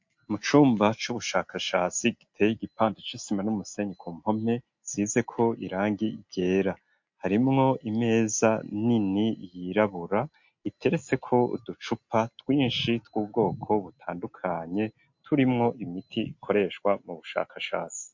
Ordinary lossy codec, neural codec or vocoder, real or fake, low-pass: MP3, 48 kbps; none; real; 7.2 kHz